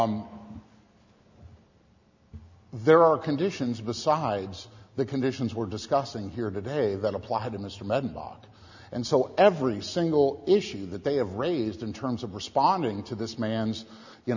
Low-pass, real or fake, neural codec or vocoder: 7.2 kHz; real; none